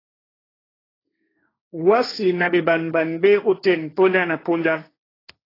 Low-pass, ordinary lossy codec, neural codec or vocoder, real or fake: 5.4 kHz; AAC, 24 kbps; codec, 16 kHz, 1.1 kbps, Voila-Tokenizer; fake